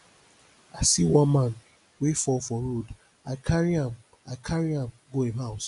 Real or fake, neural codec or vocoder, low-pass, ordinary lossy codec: real; none; 10.8 kHz; none